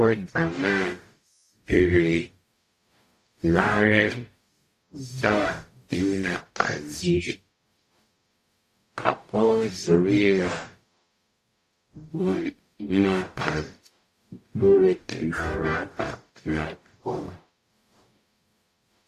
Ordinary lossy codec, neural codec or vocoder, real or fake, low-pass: AAC, 64 kbps; codec, 44.1 kHz, 0.9 kbps, DAC; fake; 14.4 kHz